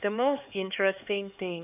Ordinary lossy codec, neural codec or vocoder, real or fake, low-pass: none; codec, 16 kHz, 4 kbps, X-Codec, HuBERT features, trained on LibriSpeech; fake; 3.6 kHz